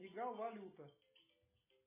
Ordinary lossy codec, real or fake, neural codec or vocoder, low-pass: MP3, 16 kbps; real; none; 3.6 kHz